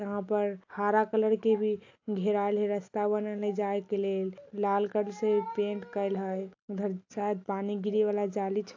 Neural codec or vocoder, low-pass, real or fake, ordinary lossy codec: none; 7.2 kHz; real; AAC, 48 kbps